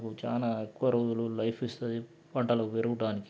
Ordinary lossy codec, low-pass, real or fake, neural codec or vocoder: none; none; real; none